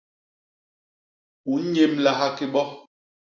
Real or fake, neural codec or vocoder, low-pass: real; none; 7.2 kHz